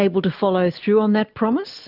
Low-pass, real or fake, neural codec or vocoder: 5.4 kHz; real; none